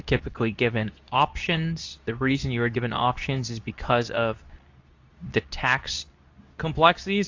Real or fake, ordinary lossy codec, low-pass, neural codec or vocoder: fake; AAC, 48 kbps; 7.2 kHz; codec, 24 kHz, 0.9 kbps, WavTokenizer, medium speech release version 2